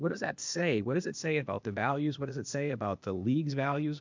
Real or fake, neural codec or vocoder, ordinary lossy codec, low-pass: fake; codec, 16 kHz, 0.8 kbps, ZipCodec; MP3, 64 kbps; 7.2 kHz